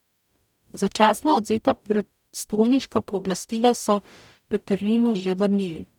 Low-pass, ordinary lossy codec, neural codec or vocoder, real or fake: 19.8 kHz; none; codec, 44.1 kHz, 0.9 kbps, DAC; fake